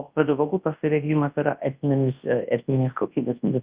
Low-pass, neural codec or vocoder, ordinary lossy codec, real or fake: 3.6 kHz; codec, 24 kHz, 0.9 kbps, WavTokenizer, large speech release; Opus, 32 kbps; fake